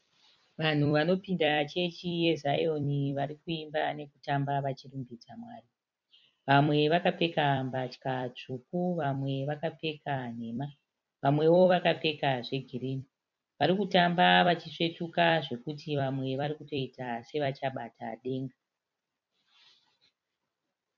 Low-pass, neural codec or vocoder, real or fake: 7.2 kHz; vocoder, 44.1 kHz, 128 mel bands every 256 samples, BigVGAN v2; fake